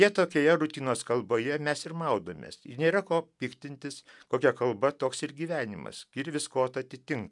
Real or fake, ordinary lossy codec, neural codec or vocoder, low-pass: real; MP3, 96 kbps; none; 10.8 kHz